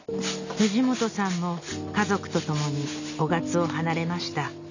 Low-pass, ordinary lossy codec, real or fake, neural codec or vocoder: 7.2 kHz; none; real; none